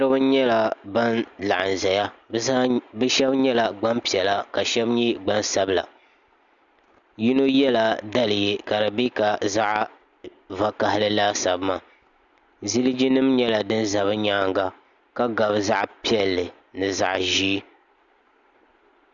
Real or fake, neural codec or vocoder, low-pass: real; none; 7.2 kHz